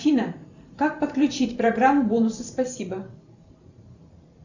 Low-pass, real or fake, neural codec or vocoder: 7.2 kHz; fake; vocoder, 44.1 kHz, 128 mel bands every 512 samples, BigVGAN v2